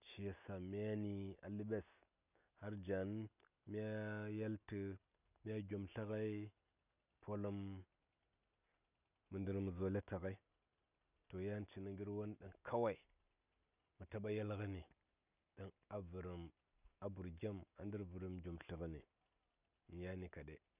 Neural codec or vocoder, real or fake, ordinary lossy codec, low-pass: none; real; none; 3.6 kHz